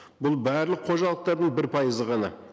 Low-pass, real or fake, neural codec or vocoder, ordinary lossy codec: none; real; none; none